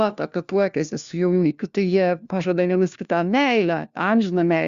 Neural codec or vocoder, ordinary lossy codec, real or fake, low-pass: codec, 16 kHz, 1 kbps, FunCodec, trained on LibriTTS, 50 frames a second; Opus, 64 kbps; fake; 7.2 kHz